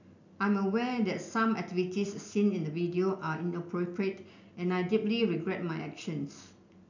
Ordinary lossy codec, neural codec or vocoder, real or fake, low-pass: none; none; real; 7.2 kHz